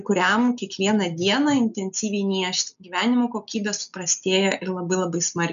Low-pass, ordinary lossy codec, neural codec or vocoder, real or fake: 7.2 kHz; MP3, 96 kbps; none; real